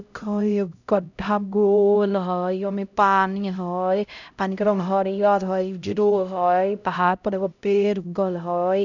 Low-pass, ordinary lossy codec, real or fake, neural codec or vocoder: 7.2 kHz; none; fake; codec, 16 kHz, 0.5 kbps, X-Codec, HuBERT features, trained on LibriSpeech